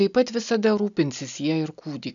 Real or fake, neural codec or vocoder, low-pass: real; none; 7.2 kHz